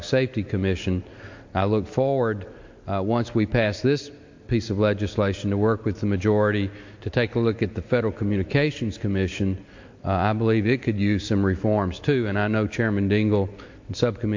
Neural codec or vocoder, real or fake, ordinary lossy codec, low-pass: none; real; MP3, 48 kbps; 7.2 kHz